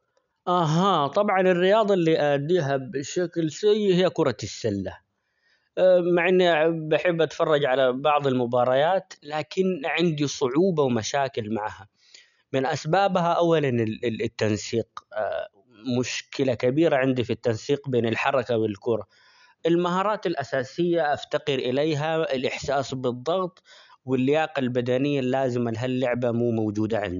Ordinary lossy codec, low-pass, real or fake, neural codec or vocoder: MP3, 96 kbps; 7.2 kHz; real; none